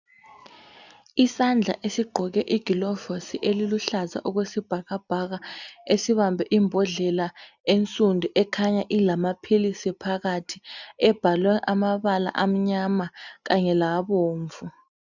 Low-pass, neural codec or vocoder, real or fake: 7.2 kHz; none; real